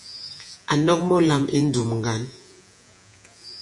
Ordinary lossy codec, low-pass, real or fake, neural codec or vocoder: MP3, 96 kbps; 10.8 kHz; fake; vocoder, 48 kHz, 128 mel bands, Vocos